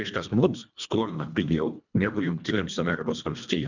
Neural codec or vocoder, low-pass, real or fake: codec, 24 kHz, 1.5 kbps, HILCodec; 7.2 kHz; fake